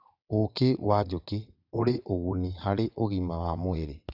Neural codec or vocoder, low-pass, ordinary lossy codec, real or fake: vocoder, 22.05 kHz, 80 mel bands, WaveNeXt; 5.4 kHz; none; fake